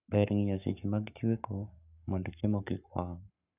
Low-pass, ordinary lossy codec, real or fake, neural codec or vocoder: 3.6 kHz; none; fake; codec, 44.1 kHz, 7.8 kbps, Pupu-Codec